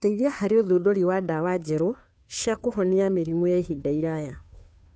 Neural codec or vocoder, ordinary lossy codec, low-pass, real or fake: codec, 16 kHz, 2 kbps, FunCodec, trained on Chinese and English, 25 frames a second; none; none; fake